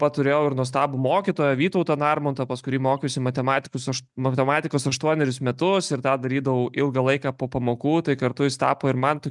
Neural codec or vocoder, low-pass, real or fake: none; 10.8 kHz; real